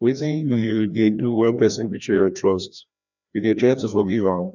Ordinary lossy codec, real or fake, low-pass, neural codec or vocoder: none; fake; 7.2 kHz; codec, 16 kHz, 1 kbps, FreqCodec, larger model